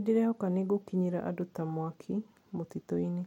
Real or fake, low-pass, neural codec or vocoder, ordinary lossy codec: real; 19.8 kHz; none; MP3, 64 kbps